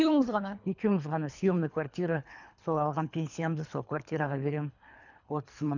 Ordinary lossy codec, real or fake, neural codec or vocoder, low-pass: none; fake; codec, 24 kHz, 3 kbps, HILCodec; 7.2 kHz